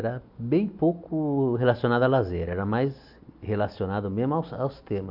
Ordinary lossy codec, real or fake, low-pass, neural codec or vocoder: AAC, 48 kbps; real; 5.4 kHz; none